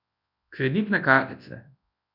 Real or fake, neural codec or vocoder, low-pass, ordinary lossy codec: fake; codec, 24 kHz, 0.9 kbps, WavTokenizer, large speech release; 5.4 kHz; none